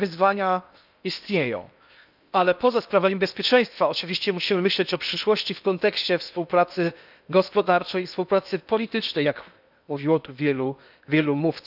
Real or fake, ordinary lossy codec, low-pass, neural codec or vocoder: fake; none; 5.4 kHz; codec, 16 kHz in and 24 kHz out, 0.8 kbps, FocalCodec, streaming, 65536 codes